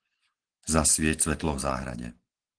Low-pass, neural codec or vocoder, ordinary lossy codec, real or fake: 9.9 kHz; none; Opus, 16 kbps; real